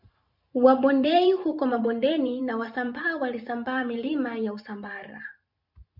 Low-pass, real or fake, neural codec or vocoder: 5.4 kHz; fake; vocoder, 44.1 kHz, 128 mel bands every 512 samples, BigVGAN v2